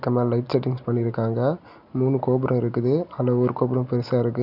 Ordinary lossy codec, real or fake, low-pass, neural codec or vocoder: none; real; 5.4 kHz; none